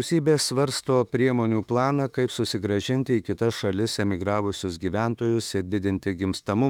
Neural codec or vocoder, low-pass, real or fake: autoencoder, 48 kHz, 32 numbers a frame, DAC-VAE, trained on Japanese speech; 19.8 kHz; fake